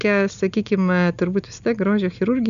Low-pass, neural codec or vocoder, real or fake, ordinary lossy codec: 7.2 kHz; none; real; MP3, 96 kbps